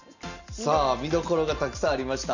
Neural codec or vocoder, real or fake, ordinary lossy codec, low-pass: none; real; none; 7.2 kHz